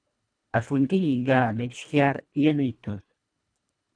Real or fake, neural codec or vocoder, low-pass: fake; codec, 24 kHz, 1.5 kbps, HILCodec; 9.9 kHz